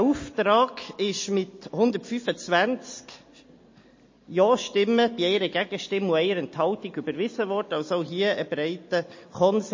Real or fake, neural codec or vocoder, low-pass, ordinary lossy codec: real; none; 7.2 kHz; MP3, 32 kbps